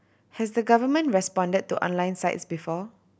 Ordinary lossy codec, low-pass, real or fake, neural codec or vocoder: none; none; real; none